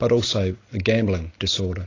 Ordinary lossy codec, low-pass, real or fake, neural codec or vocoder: AAC, 32 kbps; 7.2 kHz; real; none